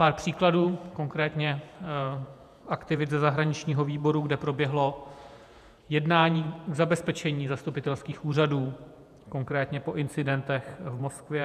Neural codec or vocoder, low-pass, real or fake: vocoder, 48 kHz, 128 mel bands, Vocos; 14.4 kHz; fake